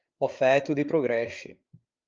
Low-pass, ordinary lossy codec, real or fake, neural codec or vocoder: 7.2 kHz; Opus, 24 kbps; fake; codec, 16 kHz, 8 kbps, FreqCodec, larger model